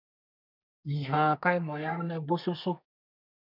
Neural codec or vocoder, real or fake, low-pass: codec, 32 kHz, 1.9 kbps, SNAC; fake; 5.4 kHz